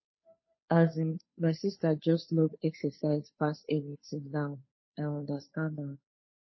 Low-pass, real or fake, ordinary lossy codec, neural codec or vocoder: 7.2 kHz; fake; MP3, 24 kbps; codec, 16 kHz, 2 kbps, FunCodec, trained on Chinese and English, 25 frames a second